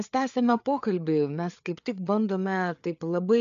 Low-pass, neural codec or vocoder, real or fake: 7.2 kHz; codec, 16 kHz, 4 kbps, FreqCodec, larger model; fake